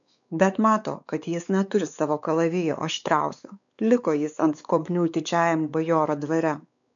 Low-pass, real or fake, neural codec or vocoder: 7.2 kHz; fake; codec, 16 kHz, 4 kbps, X-Codec, WavLM features, trained on Multilingual LibriSpeech